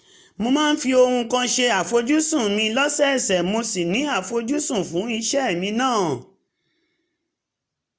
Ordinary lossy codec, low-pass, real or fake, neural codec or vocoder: none; none; real; none